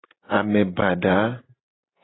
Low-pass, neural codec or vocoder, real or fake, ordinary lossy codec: 7.2 kHz; none; real; AAC, 16 kbps